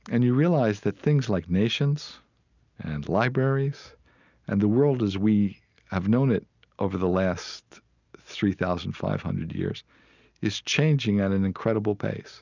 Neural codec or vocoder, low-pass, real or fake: none; 7.2 kHz; real